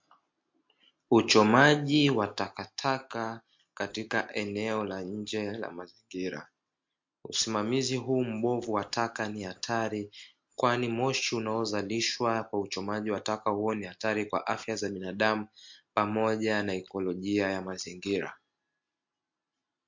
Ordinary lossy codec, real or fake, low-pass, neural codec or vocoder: MP3, 48 kbps; real; 7.2 kHz; none